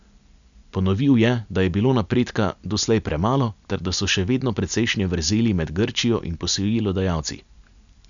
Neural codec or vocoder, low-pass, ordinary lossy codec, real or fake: none; 7.2 kHz; none; real